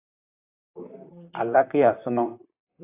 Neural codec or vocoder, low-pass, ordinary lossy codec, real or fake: vocoder, 44.1 kHz, 128 mel bands, Pupu-Vocoder; 3.6 kHz; AAC, 24 kbps; fake